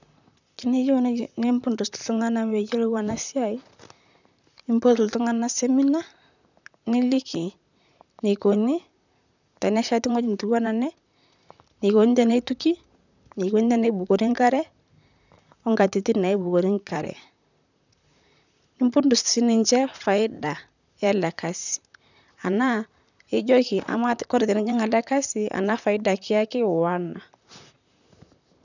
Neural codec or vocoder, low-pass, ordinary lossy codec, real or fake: vocoder, 44.1 kHz, 128 mel bands, Pupu-Vocoder; 7.2 kHz; none; fake